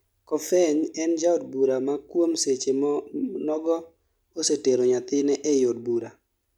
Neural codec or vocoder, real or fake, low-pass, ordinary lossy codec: none; real; 19.8 kHz; none